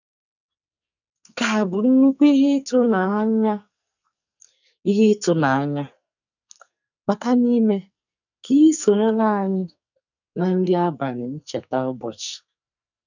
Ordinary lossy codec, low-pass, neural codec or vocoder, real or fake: none; 7.2 kHz; codec, 44.1 kHz, 2.6 kbps, SNAC; fake